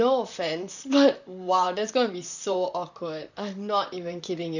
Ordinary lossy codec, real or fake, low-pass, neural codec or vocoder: none; fake; 7.2 kHz; vocoder, 44.1 kHz, 128 mel bands, Pupu-Vocoder